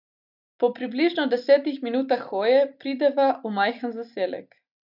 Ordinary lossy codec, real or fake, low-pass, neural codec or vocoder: none; real; 5.4 kHz; none